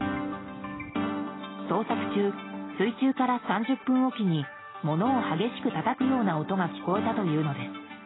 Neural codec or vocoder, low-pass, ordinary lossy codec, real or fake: none; 7.2 kHz; AAC, 16 kbps; real